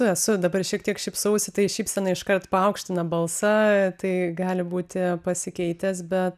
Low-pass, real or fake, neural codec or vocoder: 14.4 kHz; real; none